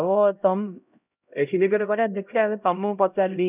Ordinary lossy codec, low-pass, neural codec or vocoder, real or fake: none; 3.6 kHz; codec, 16 kHz, 0.5 kbps, X-Codec, HuBERT features, trained on LibriSpeech; fake